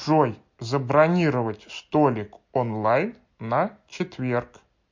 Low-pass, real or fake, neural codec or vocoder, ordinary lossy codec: 7.2 kHz; real; none; MP3, 48 kbps